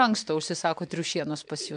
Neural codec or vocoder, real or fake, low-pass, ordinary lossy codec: none; real; 9.9 kHz; MP3, 64 kbps